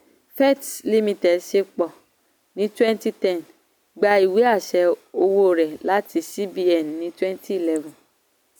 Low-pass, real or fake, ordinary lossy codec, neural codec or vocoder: none; real; none; none